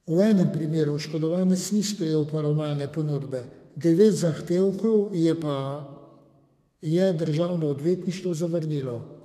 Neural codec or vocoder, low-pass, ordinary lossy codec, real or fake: codec, 32 kHz, 1.9 kbps, SNAC; 14.4 kHz; MP3, 96 kbps; fake